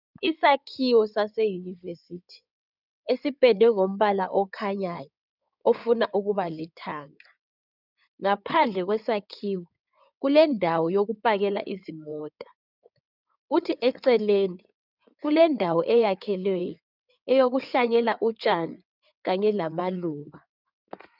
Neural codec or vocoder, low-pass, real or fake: codec, 16 kHz in and 24 kHz out, 2.2 kbps, FireRedTTS-2 codec; 5.4 kHz; fake